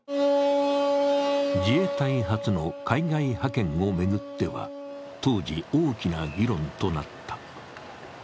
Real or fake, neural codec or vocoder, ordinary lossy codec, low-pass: real; none; none; none